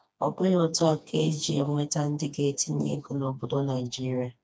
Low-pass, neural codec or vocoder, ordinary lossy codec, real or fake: none; codec, 16 kHz, 2 kbps, FreqCodec, smaller model; none; fake